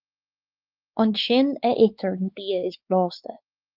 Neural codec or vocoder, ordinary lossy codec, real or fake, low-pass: codec, 16 kHz, 4 kbps, X-Codec, WavLM features, trained on Multilingual LibriSpeech; Opus, 24 kbps; fake; 5.4 kHz